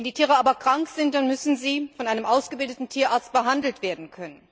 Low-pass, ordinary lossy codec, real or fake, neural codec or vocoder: none; none; real; none